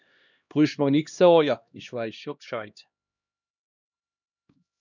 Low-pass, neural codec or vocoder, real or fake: 7.2 kHz; codec, 16 kHz, 1 kbps, X-Codec, HuBERT features, trained on LibriSpeech; fake